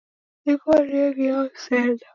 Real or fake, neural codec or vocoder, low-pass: real; none; 7.2 kHz